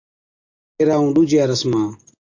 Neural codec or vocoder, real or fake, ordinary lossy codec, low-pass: none; real; AAC, 48 kbps; 7.2 kHz